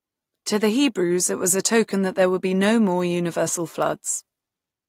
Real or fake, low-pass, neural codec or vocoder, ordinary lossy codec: real; 19.8 kHz; none; AAC, 48 kbps